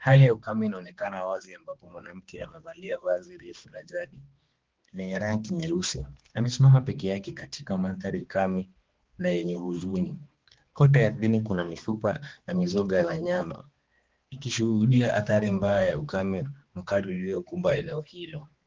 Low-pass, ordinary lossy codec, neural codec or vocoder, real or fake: 7.2 kHz; Opus, 16 kbps; codec, 16 kHz, 2 kbps, X-Codec, HuBERT features, trained on balanced general audio; fake